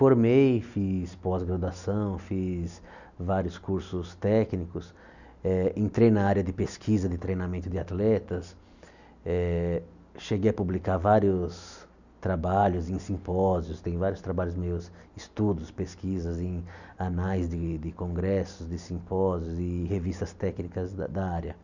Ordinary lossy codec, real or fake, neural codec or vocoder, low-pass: none; real; none; 7.2 kHz